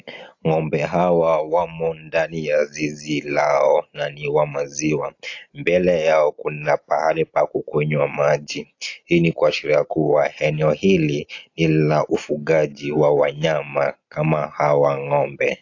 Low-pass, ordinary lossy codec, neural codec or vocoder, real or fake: 7.2 kHz; AAC, 48 kbps; none; real